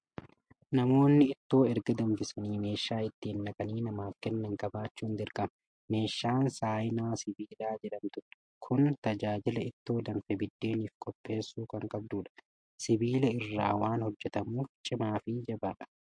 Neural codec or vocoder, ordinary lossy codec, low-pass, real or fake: none; MP3, 48 kbps; 9.9 kHz; real